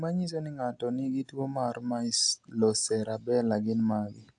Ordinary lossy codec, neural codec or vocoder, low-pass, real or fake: AAC, 64 kbps; none; 9.9 kHz; real